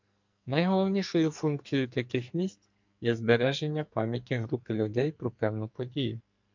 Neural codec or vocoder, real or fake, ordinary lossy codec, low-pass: codec, 44.1 kHz, 2.6 kbps, SNAC; fake; MP3, 64 kbps; 7.2 kHz